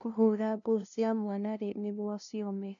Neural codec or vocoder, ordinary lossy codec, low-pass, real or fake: codec, 16 kHz, 0.5 kbps, FunCodec, trained on LibriTTS, 25 frames a second; none; 7.2 kHz; fake